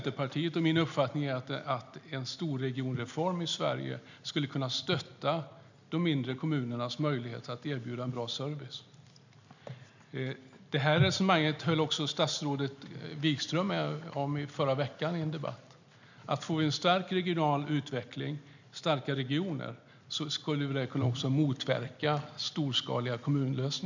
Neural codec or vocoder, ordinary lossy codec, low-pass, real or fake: none; none; 7.2 kHz; real